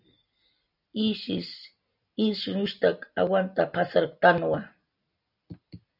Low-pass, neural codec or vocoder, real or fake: 5.4 kHz; none; real